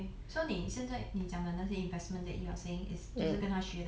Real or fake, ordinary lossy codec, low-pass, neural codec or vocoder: real; none; none; none